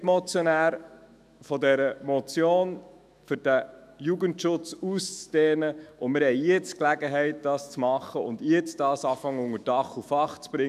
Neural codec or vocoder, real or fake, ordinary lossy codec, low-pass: autoencoder, 48 kHz, 128 numbers a frame, DAC-VAE, trained on Japanese speech; fake; none; 14.4 kHz